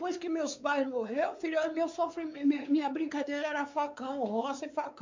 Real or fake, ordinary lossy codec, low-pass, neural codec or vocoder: fake; MP3, 64 kbps; 7.2 kHz; codec, 16 kHz, 4 kbps, X-Codec, WavLM features, trained on Multilingual LibriSpeech